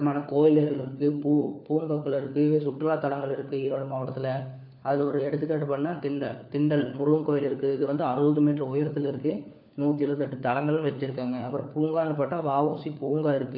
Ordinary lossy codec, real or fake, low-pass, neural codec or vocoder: none; fake; 5.4 kHz; codec, 16 kHz, 4 kbps, FunCodec, trained on LibriTTS, 50 frames a second